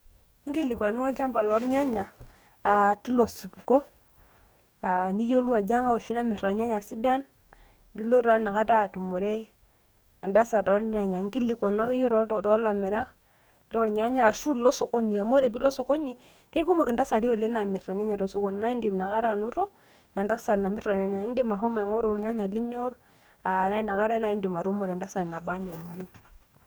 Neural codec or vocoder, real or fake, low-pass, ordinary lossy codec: codec, 44.1 kHz, 2.6 kbps, DAC; fake; none; none